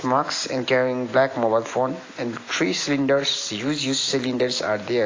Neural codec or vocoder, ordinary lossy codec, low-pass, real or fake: none; AAC, 32 kbps; 7.2 kHz; real